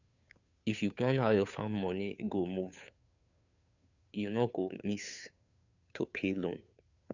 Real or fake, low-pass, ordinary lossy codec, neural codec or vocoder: fake; 7.2 kHz; none; codec, 16 kHz, 2 kbps, FunCodec, trained on Chinese and English, 25 frames a second